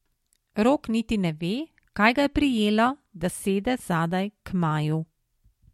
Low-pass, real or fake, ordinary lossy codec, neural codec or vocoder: 19.8 kHz; real; MP3, 64 kbps; none